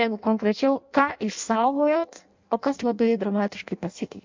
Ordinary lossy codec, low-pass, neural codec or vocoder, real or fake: MP3, 64 kbps; 7.2 kHz; codec, 16 kHz in and 24 kHz out, 0.6 kbps, FireRedTTS-2 codec; fake